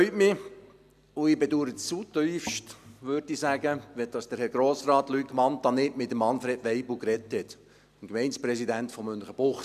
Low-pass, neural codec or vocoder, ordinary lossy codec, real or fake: 14.4 kHz; none; none; real